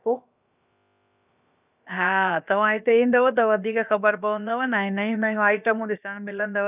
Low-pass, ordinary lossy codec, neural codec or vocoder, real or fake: 3.6 kHz; none; codec, 16 kHz, about 1 kbps, DyCAST, with the encoder's durations; fake